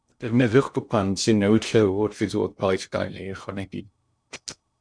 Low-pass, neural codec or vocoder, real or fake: 9.9 kHz; codec, 16 kHz in and 24 kHz out, 0.6 kbps, FocalCodec, streaming, 2048 codes; fake